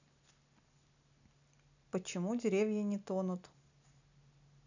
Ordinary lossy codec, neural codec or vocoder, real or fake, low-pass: none; none; real; 7.2 kHz